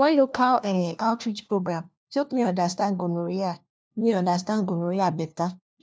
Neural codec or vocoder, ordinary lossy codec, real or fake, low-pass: codec, 16 kHz, 1 kbps, FunCodec, trained on LibriTTS, 50 frames a second; none; fake; none